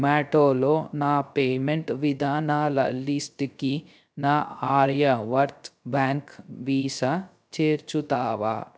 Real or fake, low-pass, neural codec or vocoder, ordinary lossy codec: fake; none; codec, 16 kHz, 0.3 kbps, FocalCodec; none